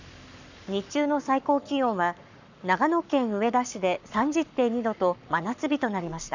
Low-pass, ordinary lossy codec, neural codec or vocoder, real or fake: 7.2 kHz; none; codec, 44.1 kHz, 7.8 kbps, Pupu-Codec; fake